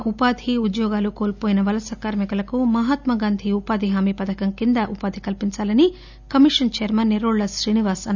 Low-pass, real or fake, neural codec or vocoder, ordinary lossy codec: 7.2 kHz; real; none; none